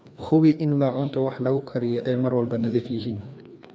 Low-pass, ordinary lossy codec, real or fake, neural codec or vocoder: none; none; fake; codec, 16 kHz, 2 kbps, FreqCodec, larger model